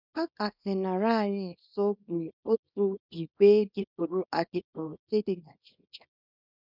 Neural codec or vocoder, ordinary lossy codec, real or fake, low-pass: codec, 24 kHz, 0.9 kbps, WavTokenizer, small release; none; fake; 5.4 kHz